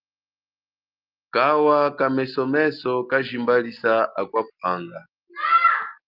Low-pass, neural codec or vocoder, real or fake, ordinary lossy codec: 5.4 kHz; none; real; Opus, 32 kbps